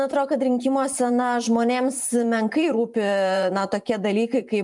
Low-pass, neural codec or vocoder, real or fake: 10.8 kHz; vocoder, 44.1 kHz, 128 mel bands every 512 samples, BigVGAN v2; fake